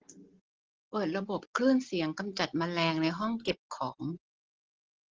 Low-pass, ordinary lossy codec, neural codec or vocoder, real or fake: 7.2 kHz; Opus, 16 kbps; none; real